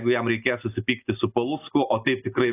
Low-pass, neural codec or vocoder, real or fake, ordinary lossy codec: 3.6 kHz; none; real; AAC, 16 kbps